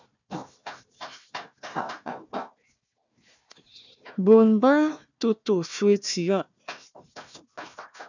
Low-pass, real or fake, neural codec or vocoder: 7.2 kHz; fake; codec, 16 kHz, 1 kbps, FunCodec, trained on Chinese and English, 50 frames a second